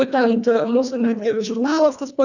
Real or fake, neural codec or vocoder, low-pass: fake; codec, 24 kHz, 1.5 kbps, HILCodec; 7.2 kHz